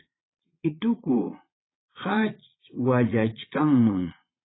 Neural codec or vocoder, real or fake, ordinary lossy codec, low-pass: vocoder, 22.05 kHz, 80 mel bands, Vocos; fake; AAC, 16 kbps; 7.2 kHz